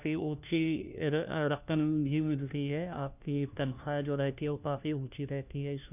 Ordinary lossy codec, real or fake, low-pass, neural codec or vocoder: none; fake; 3.6 kHz; codec, 16 kHz, 1 kbps, FunCodec, trained on LibriTTS, 50 frames a second